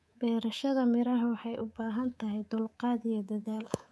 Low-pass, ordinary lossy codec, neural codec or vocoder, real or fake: none; none; codec, 24 kHz, 3.1 kbps, DualCodec; fake